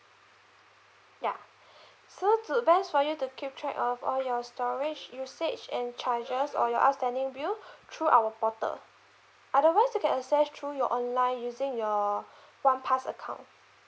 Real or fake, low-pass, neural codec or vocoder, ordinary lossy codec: real; none; none; none